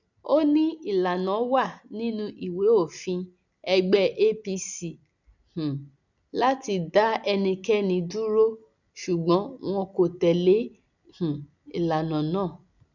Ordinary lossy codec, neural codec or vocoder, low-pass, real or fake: none; none; 7.2 kHz; real